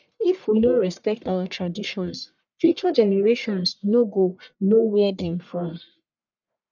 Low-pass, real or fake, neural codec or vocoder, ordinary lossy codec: 7.2 kHz; fake; codec, 44.1 kHz, 1.7 kbps, Pupu-Codec; none